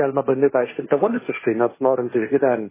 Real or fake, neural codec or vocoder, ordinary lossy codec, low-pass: fake; codec, 16 kHz, 1.1 kbps, Voila-Tokenizer; MP3, 16 kbps; 3.6 kHz